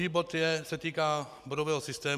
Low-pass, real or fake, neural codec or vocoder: 14.4 kHz; real; none